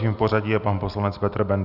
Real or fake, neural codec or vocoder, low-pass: real; none; 5.4 kHz